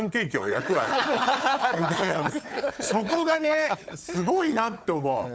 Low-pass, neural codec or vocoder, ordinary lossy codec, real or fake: none; codec, 16 kHz, 4 kbps, FunCodec, trained on Chinese and English, 50 frames a second; none; fake